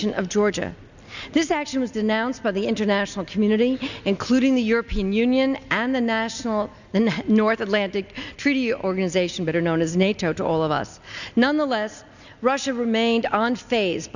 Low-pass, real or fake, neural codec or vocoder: 7.2 kHz; real; none